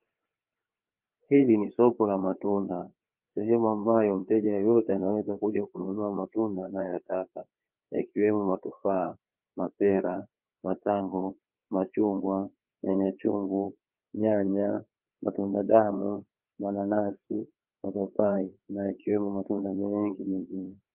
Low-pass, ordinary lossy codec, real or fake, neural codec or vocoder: 3.6 kHz; Opus, 24 kbps; fake; codec, 16 kHz, 4 kbps, FreqCodec, larger model